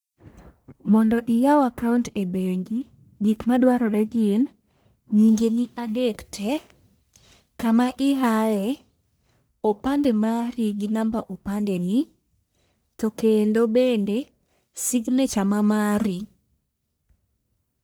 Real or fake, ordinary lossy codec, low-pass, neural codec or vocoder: fake; none; none; codec, 44.1 kHz, 1.7 kbps, Pupu-Codec